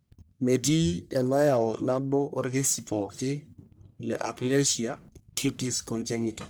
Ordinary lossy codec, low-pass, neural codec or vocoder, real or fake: none; none; codec, 44.1 kHz, 1.7 kbps, Pupu-Codec; fake